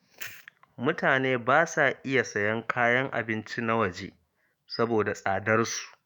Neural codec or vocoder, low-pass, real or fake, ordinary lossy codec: autoencoder, 48 kHz, 128 numbers a frame, DAC-VAE, trained on Japanese speech; none; fake; none